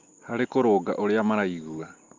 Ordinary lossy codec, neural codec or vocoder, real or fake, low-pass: Opus, 24 kbps; none; real; 7.2 kHz